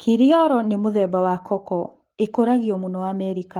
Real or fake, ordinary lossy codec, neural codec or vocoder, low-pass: fake; Opus, 24 kbps; codec, 44.1 kHz, 7.8 kbps, Pupu-Codec; 19.8 kHz